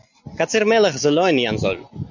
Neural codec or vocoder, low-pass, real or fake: none; 7.2 kHz; real